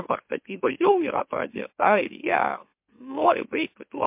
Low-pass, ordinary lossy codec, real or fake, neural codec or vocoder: 3.6 kHz; MP3, 32 kbps; fake; autoencoder, 44.1 kHz, a latent of 192 numbers a frame, MeloTTS